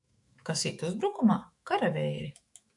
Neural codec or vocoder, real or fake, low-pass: autoencoder, 48 kHz, 128 numbers a frame, DAC-VAE, trained on Japanese speech; fake; 10.8 kHz